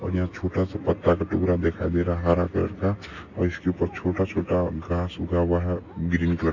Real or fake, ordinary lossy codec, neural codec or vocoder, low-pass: real; none; none; 7.2 kHz